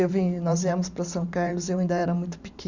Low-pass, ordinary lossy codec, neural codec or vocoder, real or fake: 7.2 kHz; none; vocoder, 44.1 kHz, 128 mel bands every 512 samples, BigVGAN v2; fake